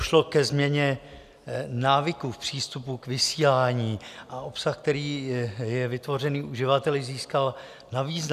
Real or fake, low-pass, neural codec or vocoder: real; 14.4 kHz; none